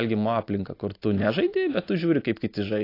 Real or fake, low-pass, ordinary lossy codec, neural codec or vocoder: real; 5.4 kHz; AAC, 32 kbps; none